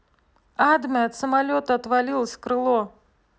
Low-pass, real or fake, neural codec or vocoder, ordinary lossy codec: none; real; none; none